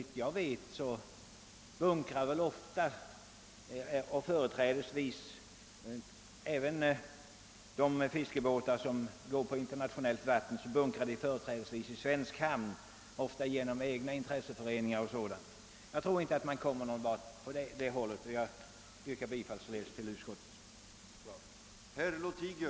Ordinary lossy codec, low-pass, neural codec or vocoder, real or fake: none; none; none; real